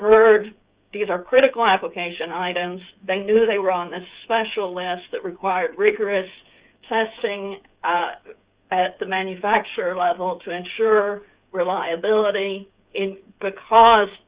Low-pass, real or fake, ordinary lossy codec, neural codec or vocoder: 3.6 kHz; fake; Opus, 64 kbps; codec, 16 kHz, 8 kbps, FreqCodec, smaller model